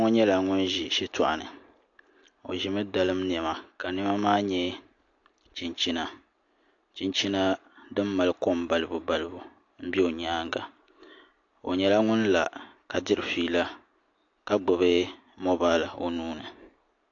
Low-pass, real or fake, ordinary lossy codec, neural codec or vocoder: 7.2 kHz; real; AAC, 48 kbps; none